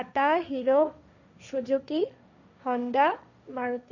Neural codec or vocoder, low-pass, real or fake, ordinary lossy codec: codec, 16 kHz, 1.1 kbps, Voila-Tokenizer; 7.2 kHz; fake; none